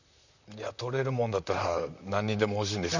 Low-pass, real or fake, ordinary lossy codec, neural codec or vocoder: 7.2 kHz; fake; none; vocoder, 44.1 kHz, 128 mel bands, Pupu-Vocoder